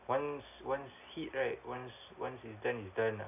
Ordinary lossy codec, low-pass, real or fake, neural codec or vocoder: none; 3.6 kHz; real; none